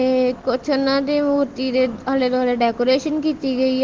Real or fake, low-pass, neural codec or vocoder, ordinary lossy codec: real; 7.2 kHz; none; Opus, 16 kbps